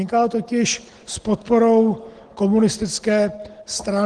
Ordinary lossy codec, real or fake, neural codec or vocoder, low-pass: Opus, 16 kbps; real; none; 9.9 kHz